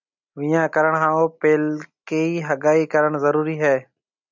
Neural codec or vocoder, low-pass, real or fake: none; 7.2 kHz; real